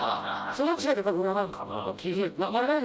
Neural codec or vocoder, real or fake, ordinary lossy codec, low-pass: codec, 16 kHz, 0.5 kbps, FreqCodec, smaller model; fake; none; none